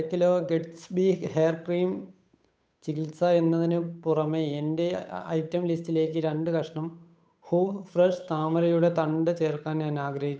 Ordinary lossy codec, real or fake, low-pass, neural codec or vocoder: none; fake; none; codec, 16 kHz, 8 kbps, FunCodec, trained on Chinese and English, 25 frames a second